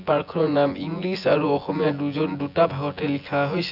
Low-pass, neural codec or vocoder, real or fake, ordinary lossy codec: 5.4 kHz; vocoder, 24 kHz, 100 mel bands, Vocos; fake; none